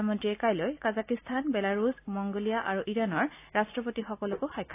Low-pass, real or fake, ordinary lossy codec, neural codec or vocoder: 3.6 kHz; real; AAC, 24 kbps; none